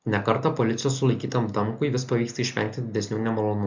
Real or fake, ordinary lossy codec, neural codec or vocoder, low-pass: real; MP3, 64 kbps; none; 7.2 kHz